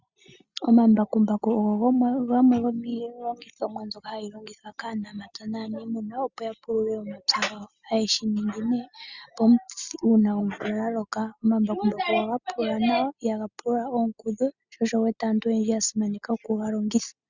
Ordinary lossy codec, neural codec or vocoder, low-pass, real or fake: Opus, 64 kbps; none; 7.2 kHz; real